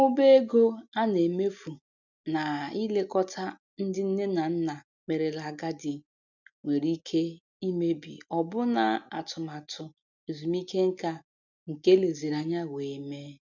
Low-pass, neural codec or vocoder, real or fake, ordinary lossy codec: 7.2 kHz; none; real; none